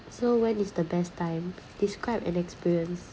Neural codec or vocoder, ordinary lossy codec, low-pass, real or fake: none; none; none; real